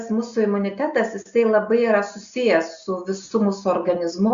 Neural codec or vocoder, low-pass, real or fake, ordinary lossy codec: none; 7.2 kHz; real; Opus, 64 kbps